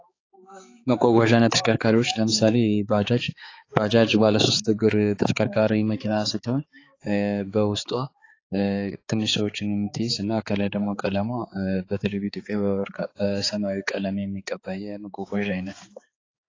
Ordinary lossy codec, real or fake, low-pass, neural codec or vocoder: AAC, 32 kbps; fake; 7.2 kHz; codec, 16 kHz, 4 kbps, X-Codec, HuBERT features, trained on balanced general audio